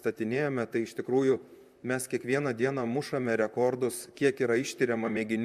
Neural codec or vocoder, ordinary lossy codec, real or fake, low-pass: vocoder, 44.1 kHz, 128 mel bands, Pupu-Vocoder; MP3, 96 kbps; fake; 14.4 kHz